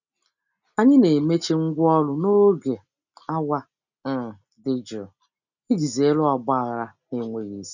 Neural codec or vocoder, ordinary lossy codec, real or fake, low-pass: none; none; real; 7.2 kHz